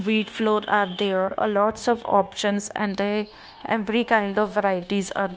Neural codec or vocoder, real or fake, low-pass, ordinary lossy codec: codec, 16 kHz, 0.9 kbps, LongCat-Audio-Codec; fake; none; none